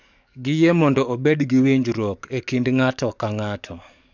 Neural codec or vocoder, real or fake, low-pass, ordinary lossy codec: codec, 44.1 kHz, 7.8 kbps, DAC; fake; 7.2 kHz; none